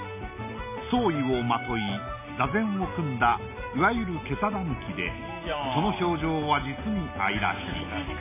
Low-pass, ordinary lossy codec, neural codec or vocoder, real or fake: 3.6 kHz; none; none; real